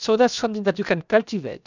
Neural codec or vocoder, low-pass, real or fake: codec, 16 kHz, about 1 kbps, DyCAST, with the encoder's durations; 7.2 kHz; fake